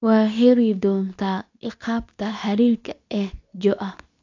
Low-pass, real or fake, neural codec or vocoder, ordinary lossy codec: 7.2 kHz; fake; codec, 24 kHz, 0.9 kbps, WavTokenizer, medium speech release version 2; AAC, 48 kbps